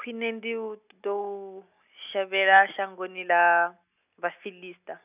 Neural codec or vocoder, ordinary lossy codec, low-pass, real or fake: none; none; 3.6 kHz; real